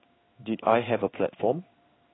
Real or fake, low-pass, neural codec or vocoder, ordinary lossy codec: fake; 7.2 kHz; codec, 16 kHz in and 24 kHz out, 1 kbps, XY-Tokenizer; AAC, 16 kbps